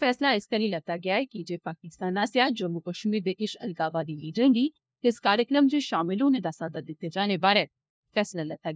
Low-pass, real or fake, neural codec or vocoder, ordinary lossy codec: none; fake; codec, 16 kHz, 1 kbps, FunCodec, trained on LibriTTS, 50 frames a second; none